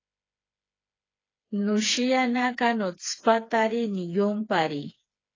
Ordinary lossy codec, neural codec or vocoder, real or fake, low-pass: AAC, 32 kbps; codec, 16 kHz, 4 kbps, FreqCodec, smaller model; fake; 7.2 kHz